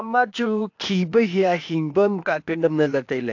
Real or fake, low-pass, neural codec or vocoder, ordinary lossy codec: fake; 7.2 kHz; codec, 16 kHz, 0.8 kbps, ZipCodec; AAC, 48 kbps